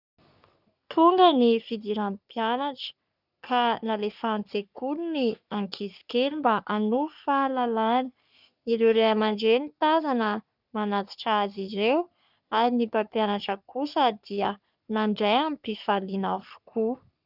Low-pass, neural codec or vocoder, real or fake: 5.4 kHz; codec, 44.1 kHz, 3.4 kbps, Pupu-Codec; fake